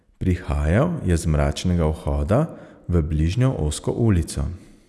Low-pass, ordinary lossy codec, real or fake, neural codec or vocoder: none; none; real; none